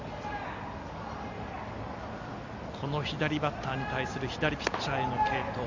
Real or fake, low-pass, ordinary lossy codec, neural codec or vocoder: real; 7.2 kHz; Opus, 64 kbps; none